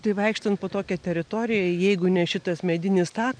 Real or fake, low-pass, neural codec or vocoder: real; 9.9 kHz; none